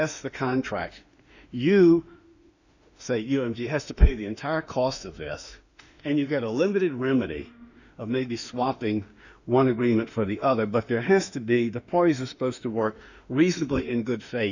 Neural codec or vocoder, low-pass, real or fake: autoencoder, 48 kHz, 32 numbers a frame, DAC-VAE, trained on Japanese speech; 7.2 kHz; fake